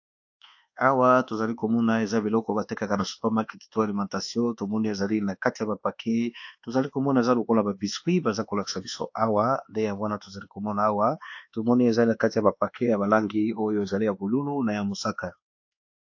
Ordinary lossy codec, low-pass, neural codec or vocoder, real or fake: AAC, 48 kbps; 7.2 kHz; codec, 24 kHz, 1.2 kbps, DualCodec; fake